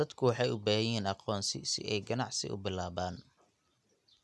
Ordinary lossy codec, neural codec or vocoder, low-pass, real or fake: none; none; none; real